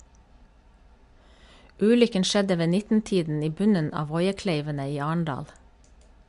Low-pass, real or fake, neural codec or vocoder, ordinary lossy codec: 10.8 kHz; real; none; MP3, 64 kbps